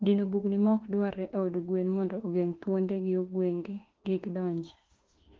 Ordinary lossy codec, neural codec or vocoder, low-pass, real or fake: Opus, 16 kbps; autoencoder, 48 kHz, 32 numbers a frame, DAC-VAE, trained on Japanese speech; 7.2 kHz; fake